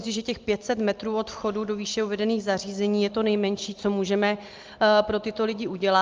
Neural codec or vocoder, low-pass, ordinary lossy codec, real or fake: none; 7.2 kHz; Opus, 32 kbps; real